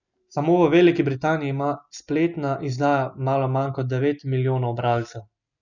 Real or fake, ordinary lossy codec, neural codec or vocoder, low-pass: real; none; none; 7.2 kHz